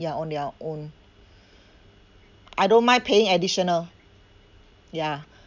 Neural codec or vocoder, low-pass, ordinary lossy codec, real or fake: none; 7.2 kHz; none; real